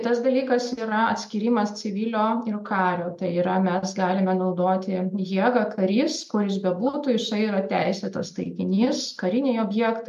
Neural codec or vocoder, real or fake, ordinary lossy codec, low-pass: none; real; MP3, 64 kbps; 14.4 kHz